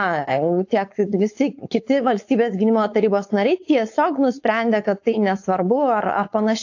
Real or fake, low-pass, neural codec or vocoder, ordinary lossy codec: fake; 7.2 kHz; codec, 16 kHz, 4.8 kbps, FACodec; AAC, 48 kbps